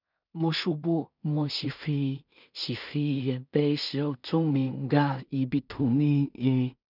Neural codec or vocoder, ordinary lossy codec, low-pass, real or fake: codec, 16 kHz in and 24 kHz out, 0.4 kbps, LongCat-Audio-Codec, two codebook decoder; none; 5.4 kHz; fake